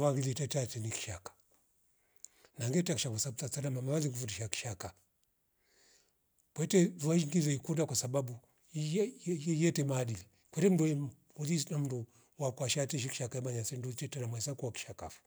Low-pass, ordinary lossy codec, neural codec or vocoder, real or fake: none; none; none; real